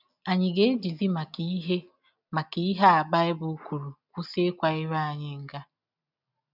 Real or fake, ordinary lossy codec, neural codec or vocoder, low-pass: real; none; none; 5.4 kHz